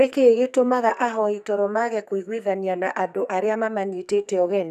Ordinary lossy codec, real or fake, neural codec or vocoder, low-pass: none; fake; codec, 44.1 kHz, 2.6 kbps, SNAC; 14.4 kHz